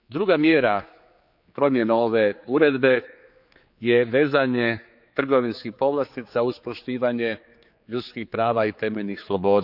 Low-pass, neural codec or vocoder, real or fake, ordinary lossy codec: 5.4 kHz; codec, 16 kHz, 4 kbps, X-Codec, HuBERT features, trained on general audio; fake; none